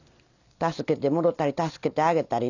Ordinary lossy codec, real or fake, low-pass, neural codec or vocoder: none; real; 7.2 kHz; none